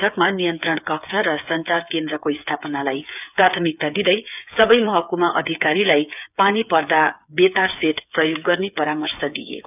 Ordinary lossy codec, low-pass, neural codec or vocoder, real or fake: none; 3.6 kHz; codec, 16 kHz, 8 kbps, FreqCodec, smaller model; fake